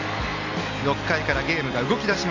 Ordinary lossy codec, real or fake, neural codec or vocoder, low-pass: MP3, 48 kbps; real; none; 7.2 kHz